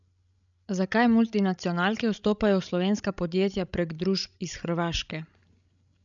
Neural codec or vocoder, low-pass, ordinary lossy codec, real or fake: codec, 16 kHz, 16 kbps, FreqCodec, larger model; 7.2 kHz; none; fake